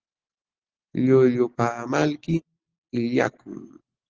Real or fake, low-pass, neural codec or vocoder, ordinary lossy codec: real; 7.2 kHz; none; Opus, 16 kbps